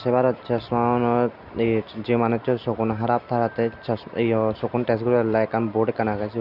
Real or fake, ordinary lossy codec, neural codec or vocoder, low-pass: real; none; none; 5.4 kHz